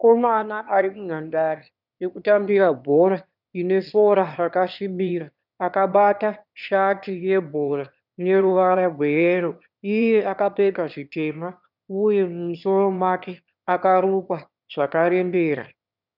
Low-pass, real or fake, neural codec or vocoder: 5.4 kHz; fake; autoencoder, 22.05 kHz, a latent of 192 numbers a frame, VITS, trained on one speaker